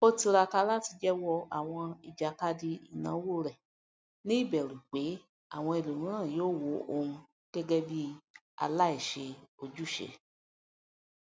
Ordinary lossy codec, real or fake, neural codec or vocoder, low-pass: none; real; none; none